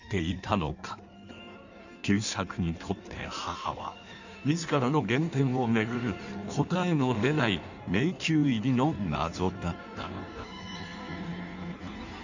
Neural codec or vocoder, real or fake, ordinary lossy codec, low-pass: codec, 16 kHz in and 24 kHz out, 1.1 kbps, FireRedTTS-2 codec; fake; none; 7.2 kHz